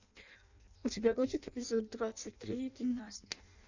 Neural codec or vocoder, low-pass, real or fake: codec, 16 kHz in and 24 kHz out, 0.6 kbps, FireRedTTS-2 codec; 7.2 kHz; fake